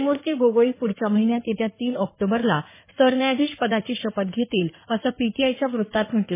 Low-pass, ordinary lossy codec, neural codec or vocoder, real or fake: 3.6 kHz; MP3, 16 kbps; codec, 16 kHz in and 24 kHz out, 2.2 kbps, FireRedTTS-2 codec; fake